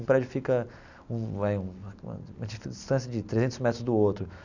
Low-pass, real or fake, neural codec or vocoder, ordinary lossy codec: 7.2 kHz; real; none; none